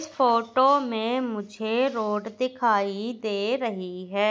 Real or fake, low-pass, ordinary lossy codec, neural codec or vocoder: real; none; none; none